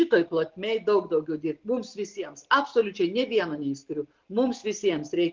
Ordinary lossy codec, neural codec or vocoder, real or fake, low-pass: Opus, 24 kbps; none; real; 7.2 kHz